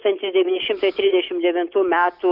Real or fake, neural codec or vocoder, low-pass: real; none; 5.4 kHz